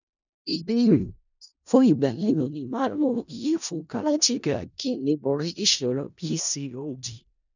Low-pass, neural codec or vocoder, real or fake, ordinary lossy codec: 7.2 kHz; codec, 16 kHz in and 24 kHz out, 0.4 kbps, LongCat-Audio-Codec, four codebook decoder; fake; none